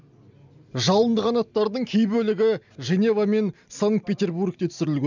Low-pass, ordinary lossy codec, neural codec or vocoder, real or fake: 7.2 kHz; none; none; real